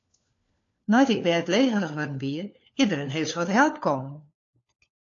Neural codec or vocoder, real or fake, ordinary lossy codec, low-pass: codec, 16 kHz, 4 kbps, FunCodec, trained on LibriTTS, 50 frames a second; fake; AAC, 48 kbps; 7.2 kHz